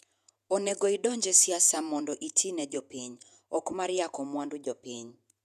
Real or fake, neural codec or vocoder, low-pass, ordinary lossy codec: fake; vocoder, 48 kHz, 128 mel bands, Vocos; 14.4 kHz; none